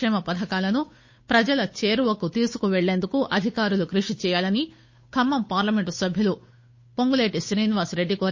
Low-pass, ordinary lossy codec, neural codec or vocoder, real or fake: 7.2 kHz; MP3, 32 kbps; codec, 16 kHz, 8 kbps, FunCodec, trained on Chinese and English, 25 frames a second; fake